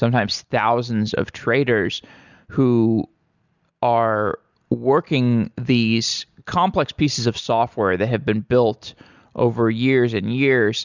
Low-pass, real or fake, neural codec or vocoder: 7.2 kHz; real; none